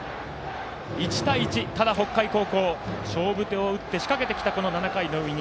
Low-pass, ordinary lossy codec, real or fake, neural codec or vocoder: none; none; real; none